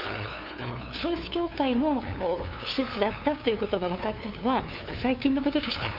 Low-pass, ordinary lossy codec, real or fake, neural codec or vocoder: 5.4 kHz; none; fake; codec, 16 kHz, 2 kbps, FunCodec, trained on LibriTTS, 25 frames a second